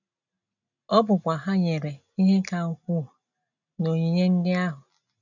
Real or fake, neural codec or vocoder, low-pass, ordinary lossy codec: real; none; 7.2 kHz; none